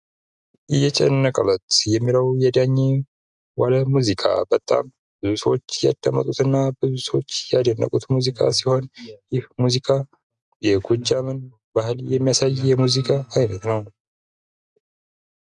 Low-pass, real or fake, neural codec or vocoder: 10.8 kHz; real; none